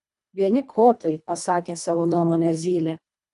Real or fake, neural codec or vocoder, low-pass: fake; codec, 24 kHz, 1.5 kbps, HILCodec; 10.8 kHz